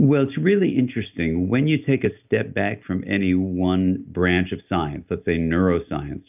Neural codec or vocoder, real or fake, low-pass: none; real; 3.6 kHz